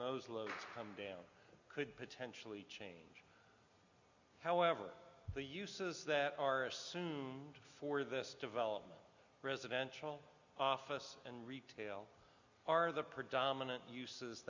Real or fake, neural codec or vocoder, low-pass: real; none; 7.2 kHz